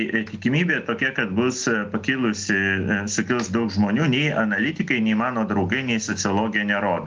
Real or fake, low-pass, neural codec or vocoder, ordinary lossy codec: real; 7.2 kHz; none; Opus, 24 kbps